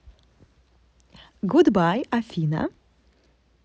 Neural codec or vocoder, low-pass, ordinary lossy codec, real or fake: none; none; none; real